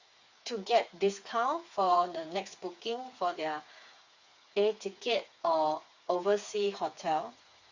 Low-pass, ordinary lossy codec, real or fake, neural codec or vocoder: 7.2 kHz; Opus, 64 kbps; fake; codec, 16 kHz, 4 kbps, FreqCodec, smaller model